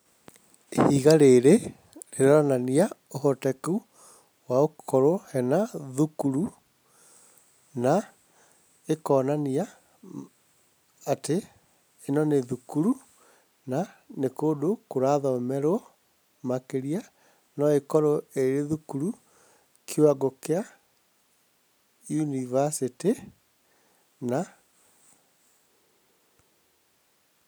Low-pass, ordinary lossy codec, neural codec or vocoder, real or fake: none; none; none; real